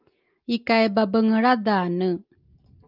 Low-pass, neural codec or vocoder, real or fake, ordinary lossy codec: 5.4 kHz; none; real; Opus, 24 kbps